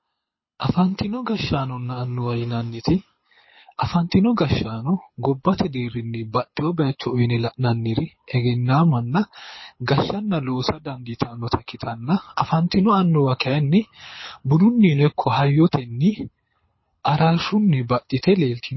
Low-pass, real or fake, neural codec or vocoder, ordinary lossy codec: 7.2 kHz; fake; codec, 24 kHz, 6 kbps, HILCodec; MP3, 24 kbps